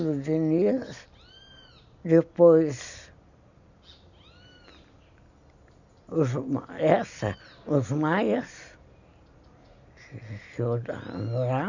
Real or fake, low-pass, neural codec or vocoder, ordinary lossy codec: real; 7.2 kHz; none; none